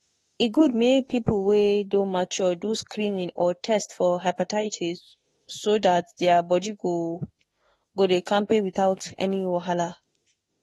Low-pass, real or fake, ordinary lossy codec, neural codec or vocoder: 19.8 kHz; fake; AAC, 32 kbps; autoencoder, 48 kHz, 32 numbers a frame, DAC-VAE, trained on Japanese speech